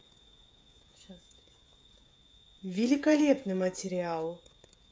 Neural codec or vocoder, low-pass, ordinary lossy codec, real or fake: codec, 16 kHz, 16 kbps, FreqCodec, smaller model; none; none; fake